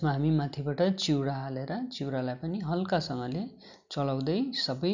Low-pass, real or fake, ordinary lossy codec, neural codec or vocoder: 7.2 kHz; real; none; none